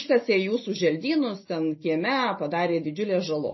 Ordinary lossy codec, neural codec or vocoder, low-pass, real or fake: MP3, 24 kbps; none; 7.2 kHz; real